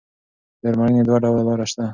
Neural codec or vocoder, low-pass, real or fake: none; 7.2 kHz; real